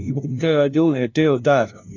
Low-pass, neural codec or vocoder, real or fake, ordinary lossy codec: 7.2 kHz; codec, 16 kHz, 0.5 kbps, FunCodec, trained on LibriTTS, 25 frames a second; fake; AAC, 48 kbps